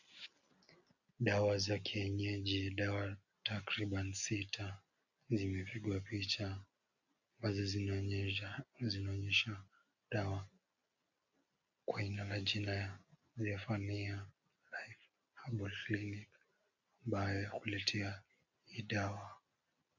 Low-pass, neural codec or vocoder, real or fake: 7.2 kHz; none; real